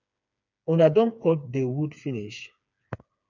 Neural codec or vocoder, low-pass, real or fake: codec, 16 kHz, 4 kbps, FreqCodec, smaller model; 7.2 kHz; fake